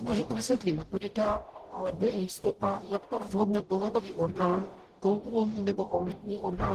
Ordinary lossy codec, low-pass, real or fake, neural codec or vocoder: Opus, 16 kbps; 14.4 kHz; fake; codec, 44.1 kHz, 0.9 kbps, DAC